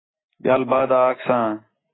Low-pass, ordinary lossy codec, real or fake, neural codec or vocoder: 7.2 kHz; AAC, 16 kbps; real; none